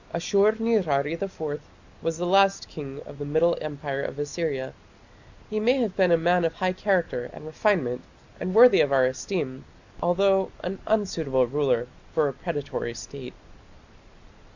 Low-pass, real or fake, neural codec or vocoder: 7.2 kHz; real; none